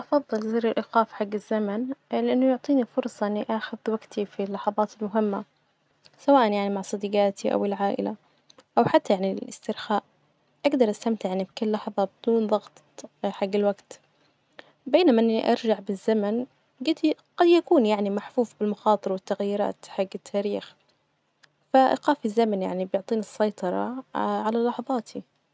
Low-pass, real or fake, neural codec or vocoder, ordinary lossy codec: none; real; none; none